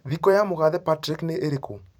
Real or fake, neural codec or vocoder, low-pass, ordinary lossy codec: fake; vocoder, 44.1 kHz, 128 mel bands every 256 samples, BigVGAN v2; 19.8 kHz; none